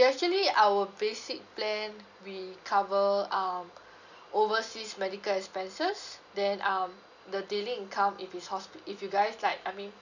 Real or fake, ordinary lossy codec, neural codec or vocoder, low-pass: real; none; none; 7.2 kHz